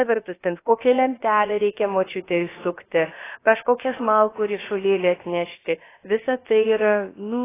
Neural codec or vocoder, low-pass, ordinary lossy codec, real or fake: codec, 16 kHz, about 1 kbps, DyCAST, with the encoder's durations; 3.6 kHz; AAC, 16 kbps; fake